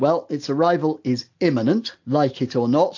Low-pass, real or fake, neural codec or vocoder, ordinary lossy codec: 7.2 kHz; real; none; AAC, 48 kbps